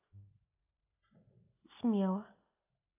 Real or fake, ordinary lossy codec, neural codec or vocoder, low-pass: real; AAC, 32 kbps; none; 3.6 kHz